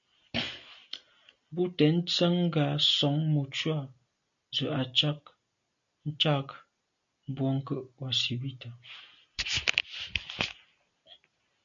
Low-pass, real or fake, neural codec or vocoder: 7.2 kHz; real; none